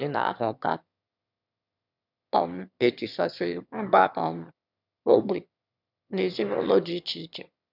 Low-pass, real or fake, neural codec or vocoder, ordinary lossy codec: 5.4 kHz; fake; autoencoder, 22.05 kHz, a latent of 192 numbers a frame, VITS, trained on one speaker; none